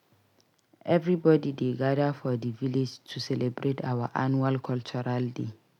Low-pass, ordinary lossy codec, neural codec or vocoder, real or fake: 19.8 kHz; none; none; real